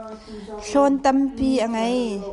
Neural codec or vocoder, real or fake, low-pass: none; real; 10.8 kHz